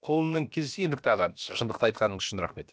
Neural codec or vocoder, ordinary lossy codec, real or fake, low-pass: codec, 16 kHz, about 1 kbps, DyCAST, with the encoder's durations; none; fake; none